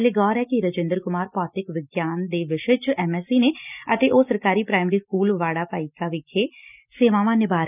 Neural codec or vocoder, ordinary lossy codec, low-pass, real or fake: none; none; 3.6 kHz; real